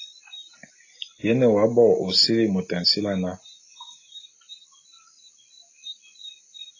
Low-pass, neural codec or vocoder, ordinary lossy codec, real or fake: 7.2 kHz; none; AAC, 32 kbps; real